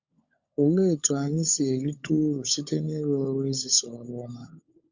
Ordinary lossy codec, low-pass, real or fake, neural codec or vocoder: Opus, 64 kbps; 7.2 kHz; fake; codec, 16 kHz, 16 kbps, FunCodec, trained on LibriTTS, 50 frames a second